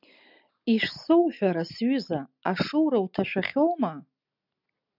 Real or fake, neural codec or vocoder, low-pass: real; none; 5.4 kHz